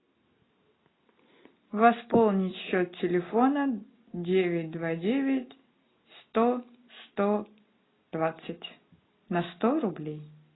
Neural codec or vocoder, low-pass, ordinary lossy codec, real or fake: none; 7.2 kHz; AAC, 16 kbps; real